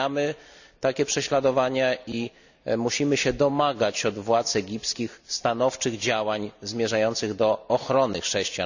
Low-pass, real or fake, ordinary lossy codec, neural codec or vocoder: 7.2 kHz; real; none; none